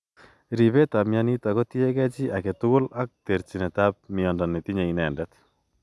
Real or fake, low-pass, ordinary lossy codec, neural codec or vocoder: real; none; none; none